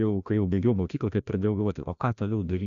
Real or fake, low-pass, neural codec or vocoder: fake; 7.2 kHz; codec, 16 kHz, 1 kbps, FreqCodec, larger model